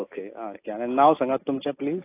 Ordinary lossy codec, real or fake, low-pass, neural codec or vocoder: AAC, 24 kbps; real; 3.6 kHz; none